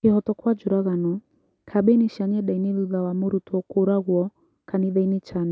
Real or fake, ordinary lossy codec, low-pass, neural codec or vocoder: real; none; none; none